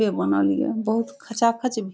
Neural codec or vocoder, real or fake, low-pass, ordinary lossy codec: none; real; none; none